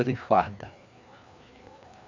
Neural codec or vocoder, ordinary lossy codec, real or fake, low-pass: codec, 16 kHz, 2 kbps, FreqCodec, larger model; MP3, 64 kbps; fake; 7.2 kHz